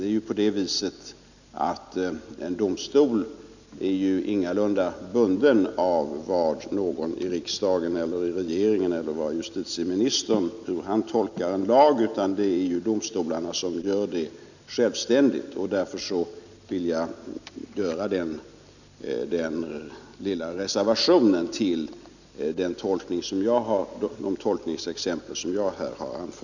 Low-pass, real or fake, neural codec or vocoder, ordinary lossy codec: 7.2 kHz; real; none; none